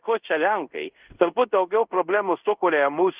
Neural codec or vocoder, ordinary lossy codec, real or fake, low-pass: codec, 24 kHz, 0.5 kbps, DualCodec; Opus, 24 kbps; fake; 3.6 kHz